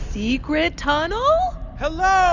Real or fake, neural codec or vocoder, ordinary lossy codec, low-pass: real; none; Opus, 64 kbps; 7.2 kHz